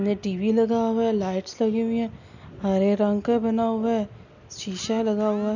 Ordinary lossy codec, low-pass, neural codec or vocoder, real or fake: none; 7.2 kHz; none; real